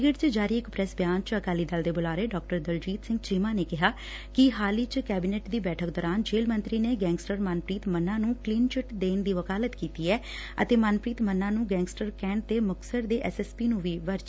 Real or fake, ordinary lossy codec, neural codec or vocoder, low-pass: real; none; none; none